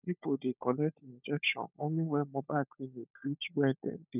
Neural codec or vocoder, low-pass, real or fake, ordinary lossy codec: codec, 16 kHz, 16 kbps, FunCodec, trained on LibriTTS, 50 frames a second; 3.6 kHz; fake; none